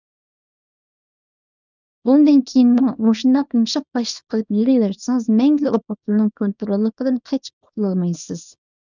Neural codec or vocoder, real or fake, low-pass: codec, 24 kHz, 0.9 kbps, WavTokenizer, small release; fake; 7.2 kHz